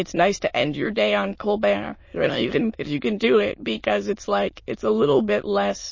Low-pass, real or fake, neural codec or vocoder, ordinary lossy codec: 7.2 kHz; fake; autoencoder, 22.05 kHz, a latent of 192 numbers a frame, VITS, trained on many speakers; MP3, 32 kbps